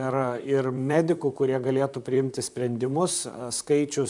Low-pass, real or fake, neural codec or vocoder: 10.8 kHz; fake; vocoder, 44.1 kHz, 128 mel bands, Pupu-Vocoder